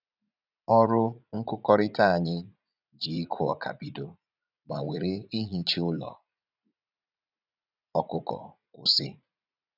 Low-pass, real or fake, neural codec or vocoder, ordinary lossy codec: 5.4 kHz; fake; vocoder, 44.1 kHz, 80 mel bands, Vocos; none